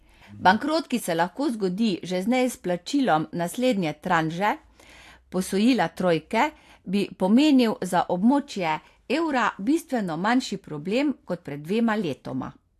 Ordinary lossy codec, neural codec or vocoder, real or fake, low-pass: AAC, 64 kbps; none; real; 14.4 kHz